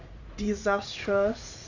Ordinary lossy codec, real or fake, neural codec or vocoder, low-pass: none; fake; vocoder, 44.1 kHz, 80 mel bands, Vocos; 7.2 kHz